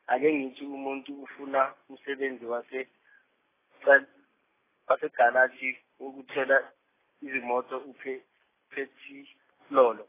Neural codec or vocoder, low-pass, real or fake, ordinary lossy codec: none; 3.6 kHz; real; AAC, 16 kbps